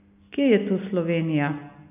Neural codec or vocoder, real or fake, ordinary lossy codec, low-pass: none; real; none; 3.6 kHz